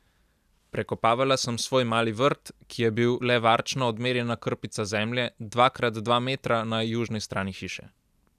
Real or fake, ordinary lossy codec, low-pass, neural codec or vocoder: real; none; 14.4 kHz; none